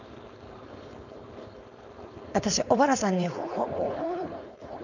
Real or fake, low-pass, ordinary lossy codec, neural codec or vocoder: fake; 7.2 kHz; none; codec, 16 kHz, 4.8 kbps, FACodec